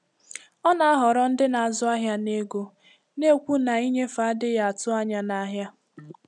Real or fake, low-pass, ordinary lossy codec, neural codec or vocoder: real; none; none; none